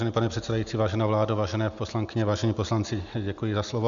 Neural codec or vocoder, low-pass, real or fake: none; 7.2 kHz; real